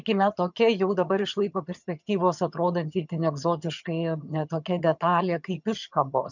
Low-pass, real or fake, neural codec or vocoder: 7.2 kHz; fake; vocoder, 22.05 kHz, 80 mel bands, HiFi-GAN